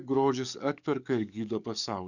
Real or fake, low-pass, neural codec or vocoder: fake; 7.2 kHz; codec, 44.1 kHz, 7.8 kbps, DAC